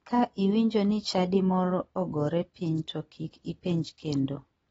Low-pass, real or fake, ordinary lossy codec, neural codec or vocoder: 19.8 kHz; real; AAC, 24 kbps; none